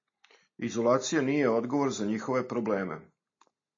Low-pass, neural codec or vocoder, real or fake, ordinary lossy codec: 7.2 kHz; none; real; MP3, 32 kbps